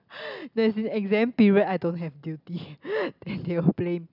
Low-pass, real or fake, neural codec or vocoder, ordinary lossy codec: 5.4 kHz; real; none; none